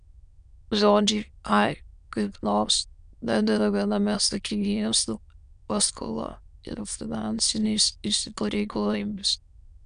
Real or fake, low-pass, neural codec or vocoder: fake; 9.9 kHz; autoencoder, 22.05 kHz, a latent of 192 numbers a frame, VITS, trained on many speakers